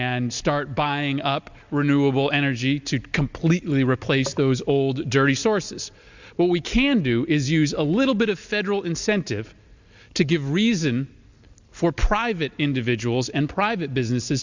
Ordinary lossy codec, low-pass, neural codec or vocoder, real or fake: Opus, 64 kbps; 7.2 kHz; none; real